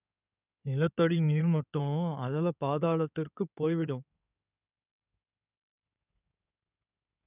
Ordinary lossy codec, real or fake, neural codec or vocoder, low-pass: none; fake; codec, 16 kHz in and 24 kHz out, 2.2 kbps, FireRedTTS-2 codec; 3.6 kHz